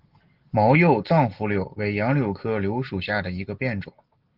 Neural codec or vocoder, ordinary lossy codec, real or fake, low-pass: none; Opus, 16 kbps; real; 5.4 kHz